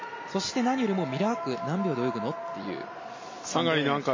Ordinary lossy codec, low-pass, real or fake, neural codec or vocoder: MP3, 32 kbps; 7.2 kHz; real; none